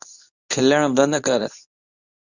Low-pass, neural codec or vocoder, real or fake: 7.2 kHz; codec, 24 kHz, 0.9 kbps, WavTokenizer, medium speech release version 2; fake